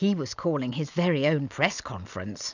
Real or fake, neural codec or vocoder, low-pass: real; none; 7.2 kHz